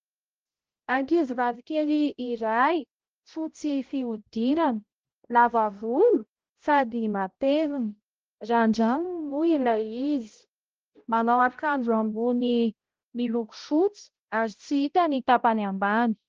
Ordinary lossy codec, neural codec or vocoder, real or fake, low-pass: Opus, 16 kbps; codec, 16 kHz, 0.5 kbps, X-Codec, HuBERT features, trained on balanced general audio; fake; 7.2 kHz